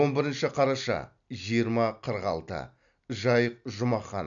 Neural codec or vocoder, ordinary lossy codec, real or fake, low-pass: none; none; real; 7.2 kHz